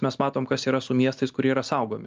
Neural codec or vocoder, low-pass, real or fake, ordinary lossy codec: none; 7.2 kHz; real; Opus, 24 kbps